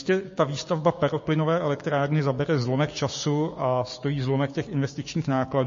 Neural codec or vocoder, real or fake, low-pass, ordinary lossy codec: codec, 16 kHz, 6 kbps, DAC; fake; 7.2 kHz; MP3, 32 kbps